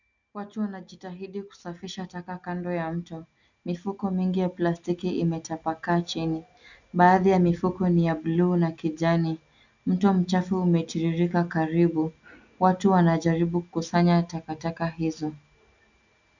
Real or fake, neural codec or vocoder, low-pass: real; none; 7.2 kHz